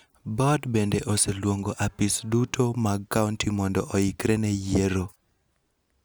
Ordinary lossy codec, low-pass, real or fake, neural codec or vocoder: none; none; real; none